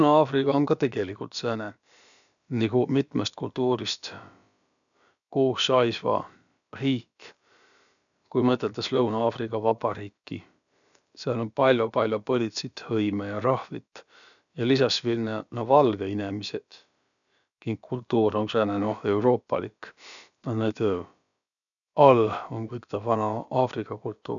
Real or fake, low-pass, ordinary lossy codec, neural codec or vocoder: fake; 7.2 kHz; none; codec, 16 kHz, about 1 kbps, DyCAST, with the encoder's durations